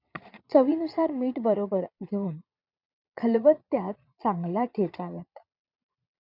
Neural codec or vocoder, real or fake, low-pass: vocoder, 22.05 kHz, 80 mel bands, Vocos; fake; 5.4 kHz